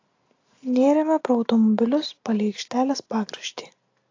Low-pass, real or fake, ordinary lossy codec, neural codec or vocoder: 7.2 kHz; real; AAC, 48 kbps; none